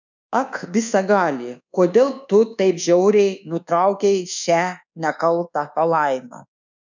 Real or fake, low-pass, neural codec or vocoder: fake; 7.2 kHz; codec, 24 kHz, 1.2 kbps, DualCodec